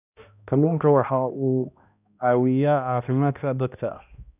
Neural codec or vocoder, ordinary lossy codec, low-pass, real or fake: codec, 16 kHz, 1 kbps, X-Codec, HuBERT features, trained on balanced general audio; none; 3.6 kHz; fake